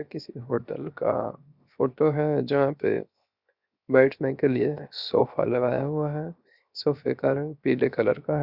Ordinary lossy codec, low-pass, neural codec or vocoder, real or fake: Opus, 64 kbps; 5.4 kHz; codec, 16 kHz, 0.7 kbps, FocalCodec; fake